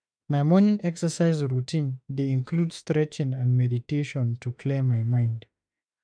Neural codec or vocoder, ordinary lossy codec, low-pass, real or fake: autoencoder, 48 kHz, 32 numbers a frame, DAC-VAE, trained on Japanese speech; none; 9.9 kHz; fake